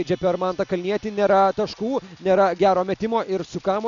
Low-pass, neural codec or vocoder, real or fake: 7.2 kHz; none; real